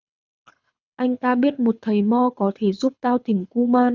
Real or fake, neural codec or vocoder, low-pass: fake; codec, 24 kHz, 6 kbps, HILCodec; 7.2 kHz